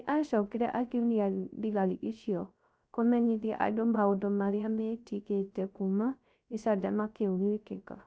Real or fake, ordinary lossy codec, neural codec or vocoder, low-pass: fake; none; codec, 16 kHz, 0.3 kbps, FocalCodec; none